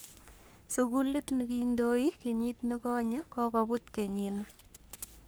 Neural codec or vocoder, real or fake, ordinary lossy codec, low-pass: codec, 44.1 kHz, 3.4 kbps, Pupu-Codec; fake; none; none